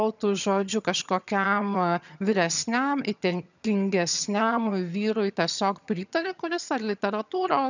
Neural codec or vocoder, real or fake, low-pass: vocoder, 22.05 kHz, 80 mel bands, HiFi-GAN; fake; 7.2 kHz